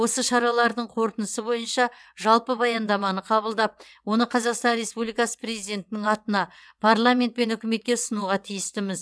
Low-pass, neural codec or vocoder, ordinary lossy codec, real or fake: none; vocoder, 22.05 kHz, 80 mel bands, WaveNeXt; none; fake